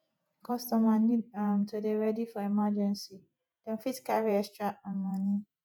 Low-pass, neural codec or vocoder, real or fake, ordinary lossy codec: 19.8 kHz; vocoder, 48 kHz, 128 mel bands, Vocos; fake; none